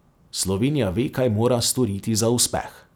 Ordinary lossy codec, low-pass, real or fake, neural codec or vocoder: none; none; real; none